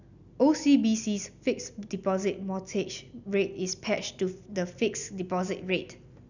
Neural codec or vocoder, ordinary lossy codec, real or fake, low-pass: none; none; real; 7.2 kHz